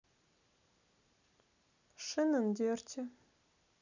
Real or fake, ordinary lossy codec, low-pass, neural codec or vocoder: real; none; 7.2 kHz; none